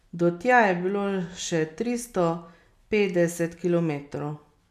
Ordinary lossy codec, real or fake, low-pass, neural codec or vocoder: none; real; 14.4 kHz; none